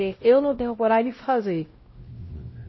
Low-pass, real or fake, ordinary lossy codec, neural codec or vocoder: 7.2 kHz; fake; MP3, 24 kbps; codec, 16 kHz, 0.5 kbps, X-Codec, WavLM features, trained on Multilingual LibriSpeech